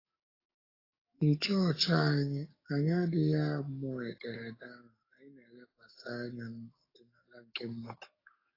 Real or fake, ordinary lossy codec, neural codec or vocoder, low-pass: fake; AAC, 24 kbps; codec, 44.1 kHz, 7.8 kbps, DAC; 5.4 kHz